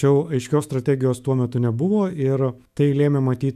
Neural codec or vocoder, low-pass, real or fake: autoencoder, 48 kHz, 128 numbers a frame, DAC-VAE, trained on Japanese speech; 14.4 kHz; fake